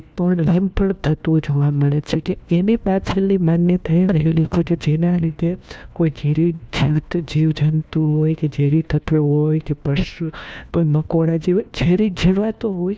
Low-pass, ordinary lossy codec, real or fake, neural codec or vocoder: none; none; fake; codec, 16 kHz, 1 kbps, FunCodec, trained on LibriTTS, 50 frames a second